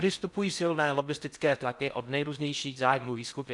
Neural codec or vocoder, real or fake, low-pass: codec, 16 kHz in and 24 kHz out, 0.6 kbps, FocalCodec, streaming, 4096 codes; fake; 10.8 kHz